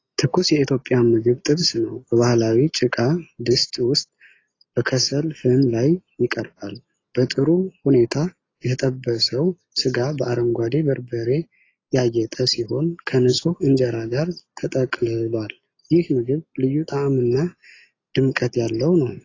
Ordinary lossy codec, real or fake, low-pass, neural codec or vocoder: AAC, 32 kbps; real; 7.2 kHz; none